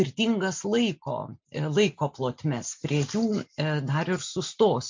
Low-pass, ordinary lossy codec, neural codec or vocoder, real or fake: 7.2 kHz; MP3, 64 kbps; none; real